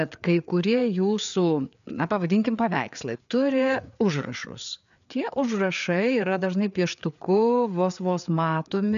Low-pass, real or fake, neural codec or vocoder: 7.2 kHz; fake; codec, 16 kHz, 4 kbps, FreqCodec, larger model